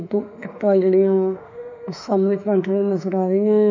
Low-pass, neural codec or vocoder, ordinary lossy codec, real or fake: 7.2 kHz; autoencoder, 48 kHz, 32 numbers a frame, DAC-VAE, trained on Japanese speech; none; fake